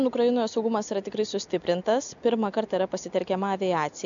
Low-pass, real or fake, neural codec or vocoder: 7.2 kHz; real; none